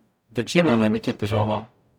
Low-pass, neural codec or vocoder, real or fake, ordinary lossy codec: 19.8 kHz; codec, 44.1 kHz, 0.9 kbps, DAC; fake; none